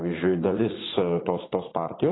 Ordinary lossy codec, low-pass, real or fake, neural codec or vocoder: AAC, 16 kbps; 7.2 kHz; fake; vocoder, 44.1 kHz, 80 mel bands, Vocos